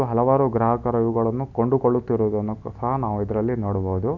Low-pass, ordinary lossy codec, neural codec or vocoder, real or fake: 7.2 kHz; none; none; real